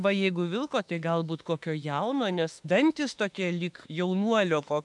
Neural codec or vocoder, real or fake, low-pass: autoencoder, 48 kHz, 32 numbers a frame, DAC-VAE, trained on Japanese speech; fake; 10.8 kHz